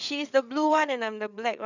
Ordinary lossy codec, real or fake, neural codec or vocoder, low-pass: none; fake; codec, 16 kHz, 8 kbps, FreqCodec, larger model; 7.2 kHz